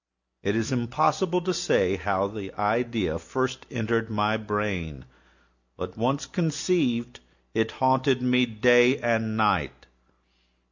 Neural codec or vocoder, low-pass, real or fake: none; 7.2 kHz; real